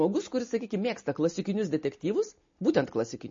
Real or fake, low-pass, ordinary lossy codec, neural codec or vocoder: real; 7.2 kHz; MP3, 32 kbps; none